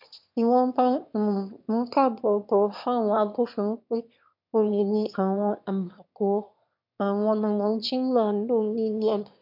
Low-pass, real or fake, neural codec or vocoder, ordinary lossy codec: 5.4 kHz; fake; autoencoder, 22.05 kHz, a latent of 192 numbers a frame, VITS, trained on one speaker; MP3, 48 kbps